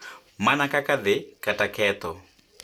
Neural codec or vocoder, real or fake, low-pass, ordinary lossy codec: none; real; 19.8 kHz; none